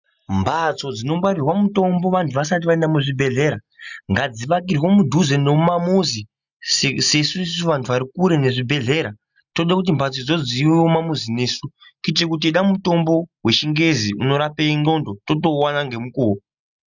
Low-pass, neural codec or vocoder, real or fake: 7.2 kHz; none; real